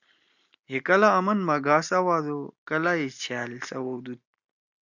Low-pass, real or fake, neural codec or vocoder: 7.2 kHz; real; none